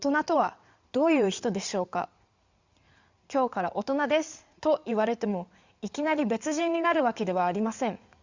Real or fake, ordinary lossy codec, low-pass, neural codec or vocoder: fake; Opus, 64 kbps; 7.2 kHz; codec, 16 kHz in and 24 kHz out, 2.2 kbps, FireRedTTS-2 codec